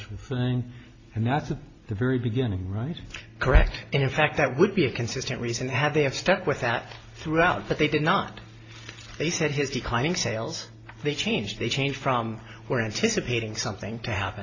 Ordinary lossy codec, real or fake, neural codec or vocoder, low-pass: AAC, 32 kbps; real; none; 7.2 kHz